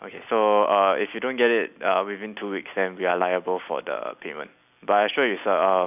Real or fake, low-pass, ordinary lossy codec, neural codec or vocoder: real; 3.6 kHz; none; none